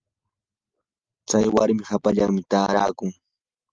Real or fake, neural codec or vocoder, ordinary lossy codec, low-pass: real; none; Opus, 32 kbps; 7.2 kHz